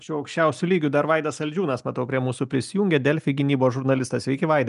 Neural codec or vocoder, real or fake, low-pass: none; real; 10.8 kHz